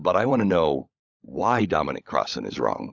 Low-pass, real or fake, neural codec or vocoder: 7.2 kHz; fake; codec, 16 kHz, 16 kbps, FunCodec, trained on LibriTTS, 50 frames a second